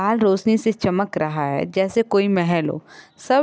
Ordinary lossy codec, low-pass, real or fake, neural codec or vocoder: none; none; real; none